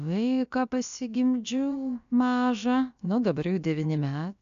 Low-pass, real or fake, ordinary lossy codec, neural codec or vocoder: 7.2 kHz; fake; AAC, 96 kbps; codec, 16 kHz, about 1 kbps, DyCAST, with the encoder's durations